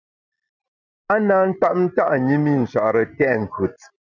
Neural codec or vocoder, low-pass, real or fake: none; 7.2 kHz; real